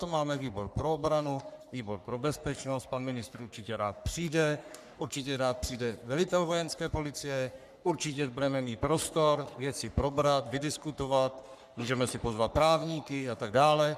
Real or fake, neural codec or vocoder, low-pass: fake; codec, 44.1 kHz, 3.4 kbps, Pupu-Codec; 14.4 kHz